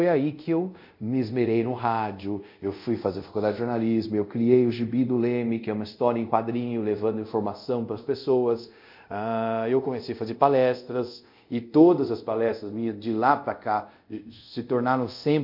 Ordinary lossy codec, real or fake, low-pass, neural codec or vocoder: MP3, 48 kbps; fake; 5.4 kHz; codec, 24 kHz, 0.5 kbps, DualCodec